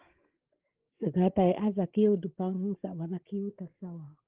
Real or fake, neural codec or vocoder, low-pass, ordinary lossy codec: fake; codec, 16 kHz, 16 kbps, FreqCodec, larger model; 3.6 kHz; Opus, 16 kbps